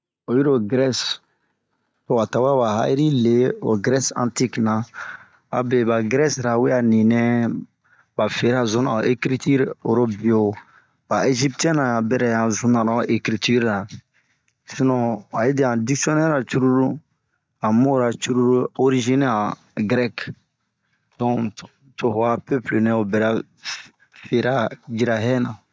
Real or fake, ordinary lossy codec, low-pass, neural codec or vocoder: real; none; none; none